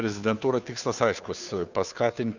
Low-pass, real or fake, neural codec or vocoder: 7.2 kHz; fake; codec, 16 kHz, 2 kbps, FunCodec, trained on Chinese and English, 25 frames a second